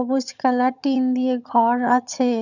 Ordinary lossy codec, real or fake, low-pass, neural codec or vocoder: none; fake; 7.2 kHz; vocoder, 22.05 kHz, 80 mel bands, HiFi-GAN